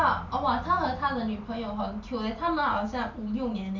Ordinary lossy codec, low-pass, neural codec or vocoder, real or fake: none; 7.2 kHz; none; real